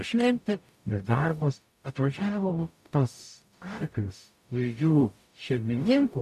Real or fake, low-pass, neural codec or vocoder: fake; 14.4 kHz; codec, 44.1 kHz, 0.9 kbps, DAC